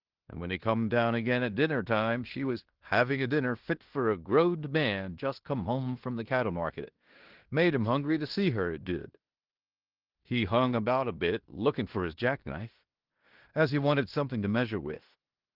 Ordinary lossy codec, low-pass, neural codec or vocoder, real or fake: Opus, 16 kbps; 5.4 kHz; codec, 16 kHz in and 24 kHz out, 0.9 kbps, LongCat-Audio-Codec, fine tuned four codebook decoder; fake